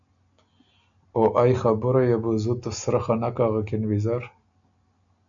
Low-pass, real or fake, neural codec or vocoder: 7.2 kHz; real; none